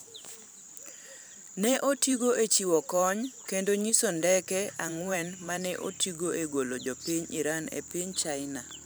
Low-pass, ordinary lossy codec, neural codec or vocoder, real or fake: none; none; vocoder, 44.1 kHz, 128 mel bands every 512 samples, BigVGAN v2; fake